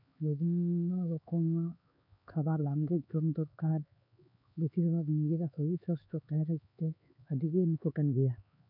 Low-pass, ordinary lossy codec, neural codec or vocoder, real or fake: 5.4 kHz; none; codec, 16 kHz, 4 kbps, X-Codec, HuBERT features, trained on LibriSpeech; fake